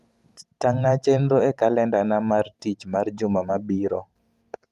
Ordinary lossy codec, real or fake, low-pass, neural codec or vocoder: Opus, 32 kbps; fake; 14.4 kHz; vocoder, 44.1 kHz, 128 mel bands every 512 samples, BigVGAN v2